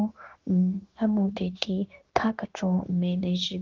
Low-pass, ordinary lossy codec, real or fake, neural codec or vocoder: 7.2 kHz; Opus, 16 kbps; fake; codec, 16 kHz, 0.9 kbps, LongCat-Audio-Codec